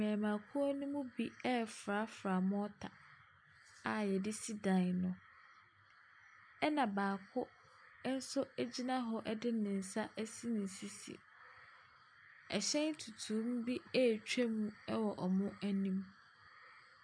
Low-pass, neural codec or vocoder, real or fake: 9.9 kHz; none; real